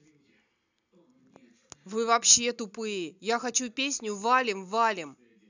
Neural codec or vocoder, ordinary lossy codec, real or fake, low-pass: none; none; real; 7.2 kHz